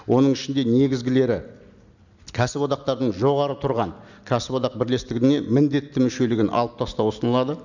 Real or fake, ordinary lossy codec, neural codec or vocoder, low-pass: real; none; none; 7.2 kHz